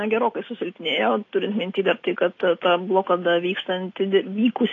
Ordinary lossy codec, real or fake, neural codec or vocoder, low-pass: AAC, 32 kbps; real; none; 7.2 kHz